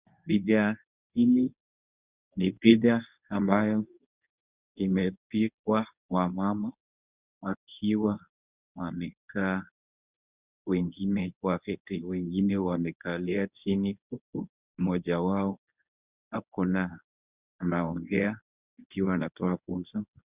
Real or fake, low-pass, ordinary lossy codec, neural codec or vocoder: fake; 3.6 kHz; Opus, 64 kbps; codec, 24 kHz, 0.9 kbps, WavTokenizer, medium speech release version 1